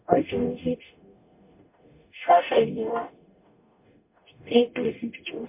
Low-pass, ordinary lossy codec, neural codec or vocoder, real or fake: 3.6 kHz; MP3, 32 kbps; codec, 44.1 kHz, 0.9 kbps, DAC; fake